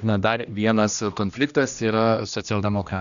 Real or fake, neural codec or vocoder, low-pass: fake; codec, 16 kHz, 1 kbps, X-Codec, HuBERT features, trained on general audio; 7.2 kHz